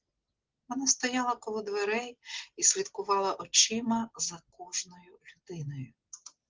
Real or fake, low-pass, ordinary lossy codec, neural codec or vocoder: real; 7.2 kHz; Opus, 16 kbps; none